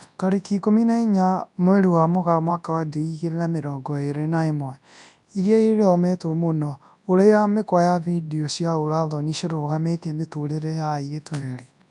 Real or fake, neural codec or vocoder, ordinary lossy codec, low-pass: fake; codec, 24 kHz, 0.9 kbps, WavTokenizer, large speech release; none; 10.8 kHz